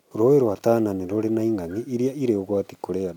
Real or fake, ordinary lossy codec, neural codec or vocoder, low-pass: real; none; none; 19.8 kHz